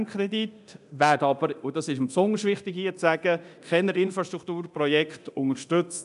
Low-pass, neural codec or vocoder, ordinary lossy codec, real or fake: 10.8 kHz; codec, 24 kHz, 0.9 kbps, DualCodec; none; fake